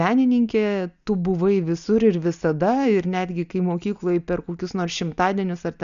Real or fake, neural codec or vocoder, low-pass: real; none; 7.2 kHz